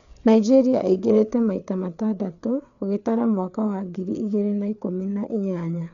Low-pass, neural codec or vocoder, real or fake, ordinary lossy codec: 7.2 kHz; codec, 16 kHz, 4 kbps, FreqCodec, larger model; fake; none